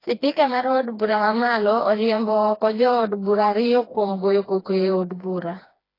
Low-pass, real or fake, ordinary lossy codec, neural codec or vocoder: 5.4 kHz; fake; AAC, 24 kbps; codec, 16 kHz, 2 kbps, FreqCodec, smaller model